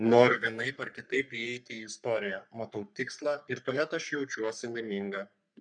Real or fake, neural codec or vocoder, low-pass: fake; codec, 44.1 kHz, 3.4 kbps, Pupu-Codec; 9.9 kHz